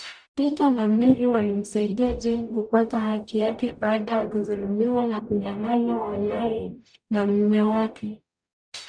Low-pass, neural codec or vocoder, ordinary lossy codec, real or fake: 9.9 kHz; codec, 44.1 kHz, 0.9 kbps, DAC; none; fake